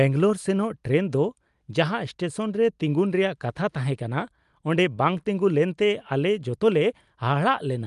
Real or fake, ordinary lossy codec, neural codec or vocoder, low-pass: real; Opus, 32 kbps; none; 10.8 kHz